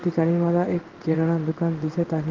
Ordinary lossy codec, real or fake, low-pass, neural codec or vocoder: Opus, 16 kbps; fake; 7.2 kHz; codec, 16 kHz in and 24 kHz out, 1 kbps, XY-Tokenizer